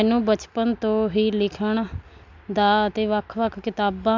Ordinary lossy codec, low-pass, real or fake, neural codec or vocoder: MP3, 64 kbps; 7.2 kHz; real; none